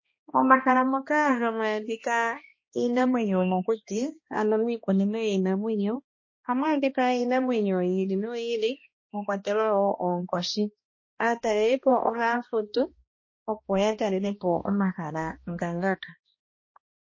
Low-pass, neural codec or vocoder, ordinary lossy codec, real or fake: 7.2 kHz; codec, 16 kHz, 1 kbps, X-Codec, HuBERT features, trained on balanced general audio; MP3, 32 kbps; fake